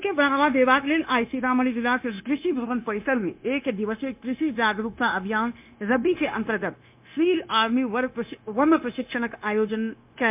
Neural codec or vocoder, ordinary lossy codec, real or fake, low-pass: codec, 16 kHz, 0.9 kbps, LongCat-Audio-Codec; MP3, 32 kbps; fake; 3.6 kHz